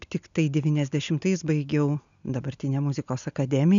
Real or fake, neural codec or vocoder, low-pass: real; none; 7.2 kHz